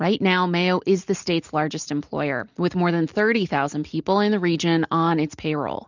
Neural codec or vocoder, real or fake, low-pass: none; real; 7.2 kHz